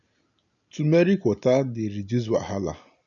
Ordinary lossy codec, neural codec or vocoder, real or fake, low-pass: MP3, 48 kbps; none; real; 7.2 kHz